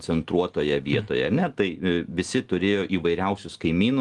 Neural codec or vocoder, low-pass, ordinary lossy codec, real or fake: none; 10.8 kHz; Opus, 24 kbps; real